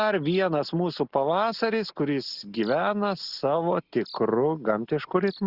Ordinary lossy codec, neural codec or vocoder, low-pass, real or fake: Opus, 64 kbps; none; 5.4 kHz; real